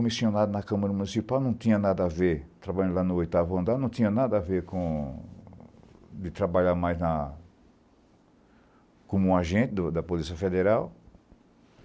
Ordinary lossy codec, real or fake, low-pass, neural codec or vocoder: none; real; none; none